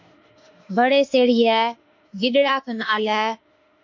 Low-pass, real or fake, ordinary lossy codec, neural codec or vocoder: 7.2 kHz; fake; MP3, 48 kbps; autoencoder, 48 kHz, 32 numbers a frame, DAC-VAE, trained on Japanese speech